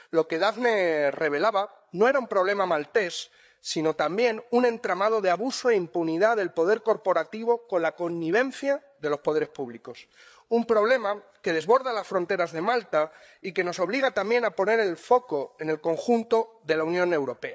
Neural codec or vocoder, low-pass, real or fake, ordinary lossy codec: codec, 16 kHz, 8 kbps, FreqCodec, larger model; none; fake; none